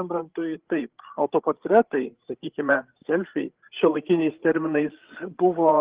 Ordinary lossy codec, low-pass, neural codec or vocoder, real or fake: Opus, 16 kbps; 3.6 kHz; codec, 16 kHz, 8 kbps, FreqCodec, larger model; fake